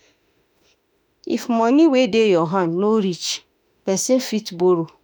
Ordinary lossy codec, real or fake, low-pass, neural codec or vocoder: none; fake; none; autoencoder, 48 kHz, 32 numbers a frame, DAC-VAE, trained on Japanese speech